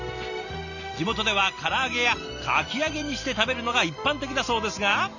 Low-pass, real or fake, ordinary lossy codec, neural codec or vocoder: 7.2 kHz; real; none; none